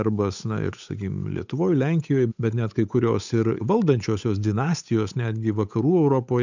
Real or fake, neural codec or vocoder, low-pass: fake; codec, 16 kHz, 8 kbps, FunCodec, trained on Chinese and English, 25 frames a second; 7.2 kHz